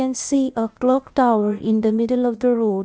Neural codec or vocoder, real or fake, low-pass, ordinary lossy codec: codec, 16 kHz, 0.8 kbps, ZipCodec; fake; none; none